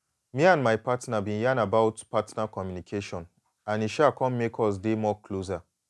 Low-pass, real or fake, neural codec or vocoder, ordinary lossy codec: none; real; none; none